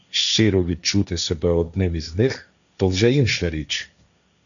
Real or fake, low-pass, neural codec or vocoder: fake; 7.2 kHz; codec, 16 kHz, 1.1 kbps, Voila-Tokenizer